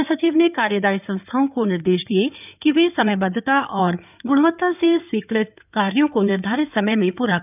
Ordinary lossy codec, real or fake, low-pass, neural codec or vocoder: none; fake; 3.6 kHz; codec, 16 kHz in and 24 kHz out, 2.2 kbps, FireRedTTS-2 codec